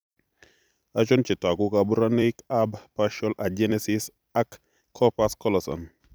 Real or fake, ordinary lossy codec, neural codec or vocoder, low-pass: fake; none; vocoder, 44.1 kHz, 128 mel bands every 256 samples, BigVGAN v2; none